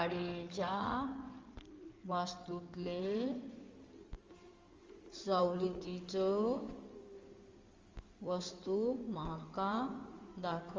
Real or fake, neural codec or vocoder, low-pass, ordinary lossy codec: fake; codec, 16 kHz in and 24 kHz out, 2.2 kbps, FireRedTTS-2 codec; 7.2 kHz; Opus, 32 kbps